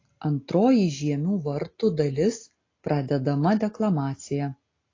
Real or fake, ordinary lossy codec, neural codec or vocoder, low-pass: real; AAC, 32 kbps; none; 7.2 kHz